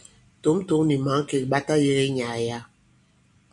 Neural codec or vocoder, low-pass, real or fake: none; 10.8 kHz; real